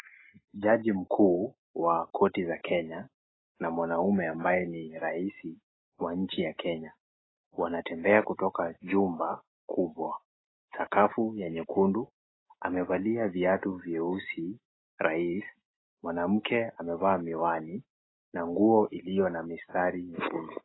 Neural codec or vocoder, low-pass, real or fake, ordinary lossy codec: none; 7.2 kHz; real; AAC, 16 kbps